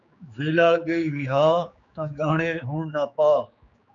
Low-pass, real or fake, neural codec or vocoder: 7.2 kHz; fake; codec, 16 kHz, 4 kbps, X-Codec, HuBERT features, trained on general audio